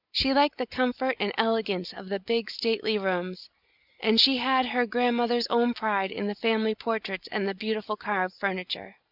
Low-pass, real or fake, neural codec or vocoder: 5.4 kHz; real; none